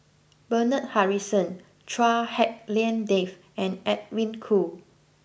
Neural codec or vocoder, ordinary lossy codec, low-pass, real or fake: none; none; none; real